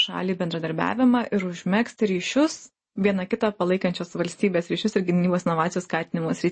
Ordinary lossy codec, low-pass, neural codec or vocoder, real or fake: MP3, 32 kbps; 9.9 kHz; none; real